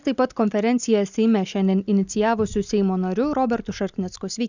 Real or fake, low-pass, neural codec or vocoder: real; 7.2 kHz; none